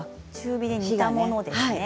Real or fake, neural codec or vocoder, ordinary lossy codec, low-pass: real; none; none; none